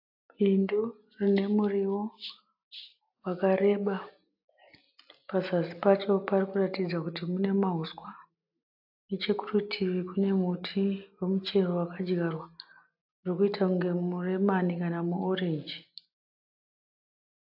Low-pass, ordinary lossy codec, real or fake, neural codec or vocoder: 5.4 kHz; AAC, 48 kbps; real; none